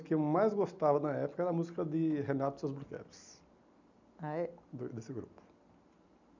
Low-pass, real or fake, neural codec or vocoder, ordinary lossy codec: 7.2 kHz; real; none; none